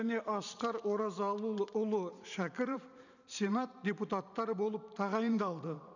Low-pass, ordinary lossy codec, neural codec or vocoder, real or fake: 7.2 kHz; none; vocoder, 44.1 kHz, 128 mel bands every 512 samples, BigVGAN v2; fake